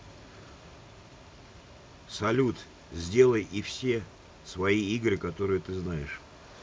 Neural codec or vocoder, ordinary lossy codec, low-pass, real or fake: none; none; none; real